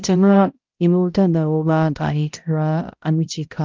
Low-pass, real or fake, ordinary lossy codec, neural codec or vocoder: 7.2 kHz; fake; Opus, 32 kbps; codec, 16 kHz, 0.5 kbps, X-Codec, HuBERT features, trained on balanced general audio